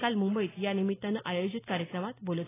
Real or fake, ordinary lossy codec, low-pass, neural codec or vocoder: real; AAC, 16 kbps; 3.6 kHz; none